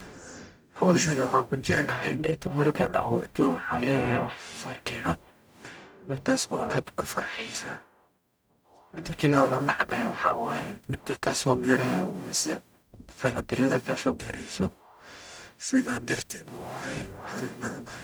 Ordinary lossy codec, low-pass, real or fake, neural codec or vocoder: none; none; fake; codec, 44.1 kHz, 0.9 kbps, DAC